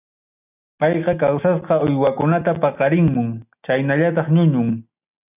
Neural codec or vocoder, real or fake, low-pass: none; real; 3.6 kHz